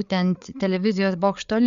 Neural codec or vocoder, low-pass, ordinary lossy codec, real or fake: codec, 16 kHz, 8 kbps, FreqCodec, larger model; 7.2 kHz; Opus, 64 kbps; fake